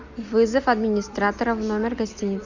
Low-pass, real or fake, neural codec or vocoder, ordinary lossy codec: 7.2 kHz; real; none; Opus, 64 kbps